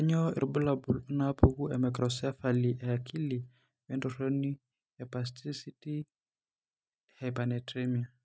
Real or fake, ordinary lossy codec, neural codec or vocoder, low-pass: real; none; none; none